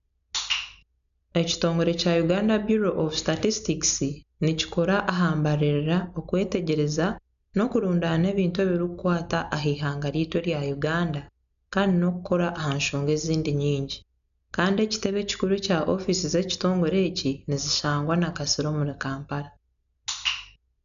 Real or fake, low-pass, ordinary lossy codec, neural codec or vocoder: real; 7.2 kHz; none; none